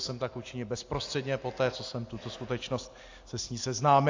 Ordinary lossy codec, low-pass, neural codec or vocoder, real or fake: AAC, 48 kbps; 7.2 kHz; none; real